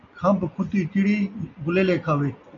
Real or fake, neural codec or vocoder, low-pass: real; none; 7.2 kHz